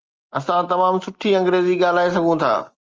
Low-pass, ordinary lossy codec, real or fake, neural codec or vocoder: 7.2 kHz; Opus, 24 kbps; real; none